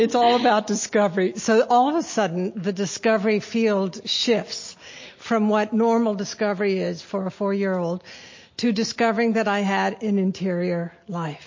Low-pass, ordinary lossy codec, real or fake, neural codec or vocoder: 7.2 kHz; MP3, 32 kbps; real; none